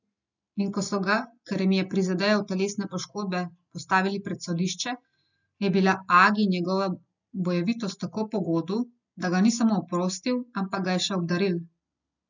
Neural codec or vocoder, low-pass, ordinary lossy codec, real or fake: none; 7.2 kHz; none; real